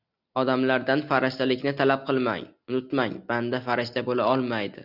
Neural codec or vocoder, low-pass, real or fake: none; 5.4 kHz; real